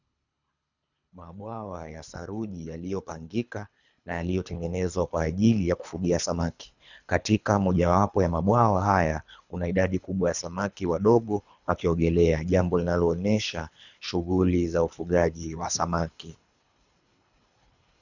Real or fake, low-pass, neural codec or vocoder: fake; 7.2 kHz; codec, 24 kHz, 3 kbps, HILCodec